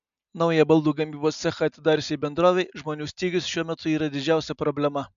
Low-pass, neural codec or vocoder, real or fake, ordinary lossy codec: 7.2 kHz; none; real; AAC, 96 kbps